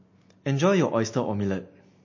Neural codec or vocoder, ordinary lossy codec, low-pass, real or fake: none; MP3, 32 kbps; 7.2 kHz; real